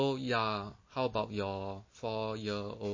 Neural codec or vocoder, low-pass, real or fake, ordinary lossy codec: none; 7.2 kHz; real; MP3, 32 kbps